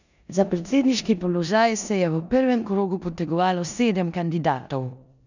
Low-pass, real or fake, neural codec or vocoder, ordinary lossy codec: 7.2 kHz; fake; codec, 16 kHz in and 24 kHz out, 0.9 kbps, LongCat-Audio-Codec, four codebook decoder; none